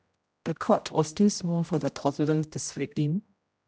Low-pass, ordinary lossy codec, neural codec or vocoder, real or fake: none; none; codec, 16 kHz, 0.5 kbps, X-Codec, HuBERT features, trained on general audio; fake